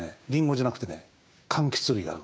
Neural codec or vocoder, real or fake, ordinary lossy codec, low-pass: codec, 16 kHz, 6 kbps, DAC; fake; none; none